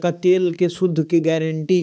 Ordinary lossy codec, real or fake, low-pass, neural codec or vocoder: none; fake; none; codec, 16 kHz, 4 kbps, X-Codec, HuBERT features, trained on balanced general audio